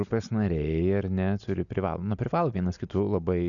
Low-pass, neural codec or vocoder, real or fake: 7.2 kHz; none; real